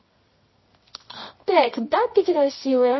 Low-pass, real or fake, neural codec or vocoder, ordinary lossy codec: 7.2 kHz; fake; codec, 24 kHz, 0.9 kbps, WavTokenizer, medium music audio release; MP3, 24 kbps